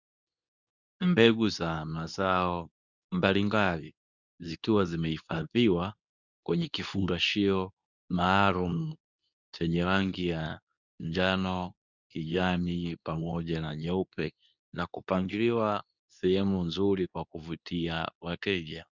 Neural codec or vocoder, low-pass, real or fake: codec, 24 kHz, 0.9 kbps, WavTokenizer, medium speech release version 2; 7.2 kHz; fake